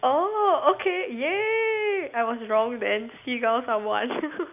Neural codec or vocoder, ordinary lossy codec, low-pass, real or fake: none; none; 3.6 kHz; real